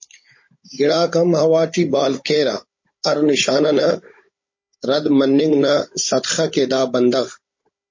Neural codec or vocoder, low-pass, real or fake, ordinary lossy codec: codec, 16 kHz, 16 kbps, FunCodec, trained on Chinese and English, 50 frames a second; 7.2 kHz; fake; MP3, 32 kbps